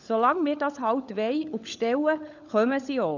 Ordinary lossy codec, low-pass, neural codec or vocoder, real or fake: none; 7.2 kHz; codec, 16 kHz, 16 kbps, FunCodec, trained on LibriTTS, 50 frames a second; fake